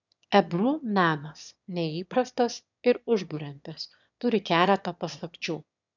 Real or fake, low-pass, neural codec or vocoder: fake; 7.2 kHz; autoencoder, 22.05 kHz, a latent of 192 numbers a frame, VITS, trained on one speaker